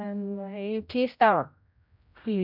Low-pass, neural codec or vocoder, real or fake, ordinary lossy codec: 5.4 kHz; codec, 16 kHz, 0.5 kbps, X-Codec, HuBERT features, trained on general audio; fake; none